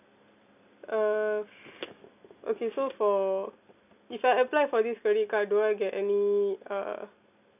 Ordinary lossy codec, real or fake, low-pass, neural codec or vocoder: none; real; 3.6 kHz; none